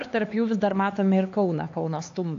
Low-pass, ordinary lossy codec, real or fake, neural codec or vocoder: 7.2 kHz; AAC, 48 kbps; fake; codec, 16 kHz, 4 kbps, X-Codec, HuBERT features, trained on LibriSpeech